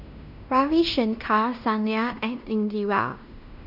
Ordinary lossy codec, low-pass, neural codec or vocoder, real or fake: none; 5.4 kHz; codec, 16 kHz in and 24 kHz out, 0.9 kbps, LongCat-Audio-Codec, fine tuned four codebook decoder; fake